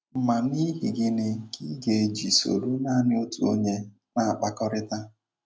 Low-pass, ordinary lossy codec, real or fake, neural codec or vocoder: none; none; real; none